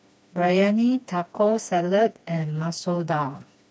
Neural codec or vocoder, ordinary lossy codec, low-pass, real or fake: codec, 16 kHz, 2 kbps, FreqCodec, smaller model; none; none; fake